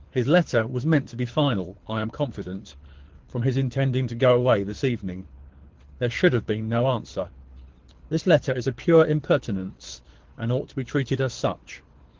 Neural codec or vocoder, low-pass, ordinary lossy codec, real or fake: codec, 24 kHz, 6 kbps, HILCodec; 7.2 kHz; Opus, 16 kbps; fake